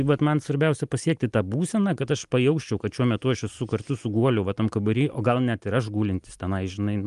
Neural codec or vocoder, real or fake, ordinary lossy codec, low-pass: none; real; Opus, 32 kbps; 10.8 kHz